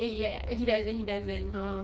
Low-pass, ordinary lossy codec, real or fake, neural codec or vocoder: none; none; fake; codec, 16 kHz, 2 kbps, FreqCodec, smaller model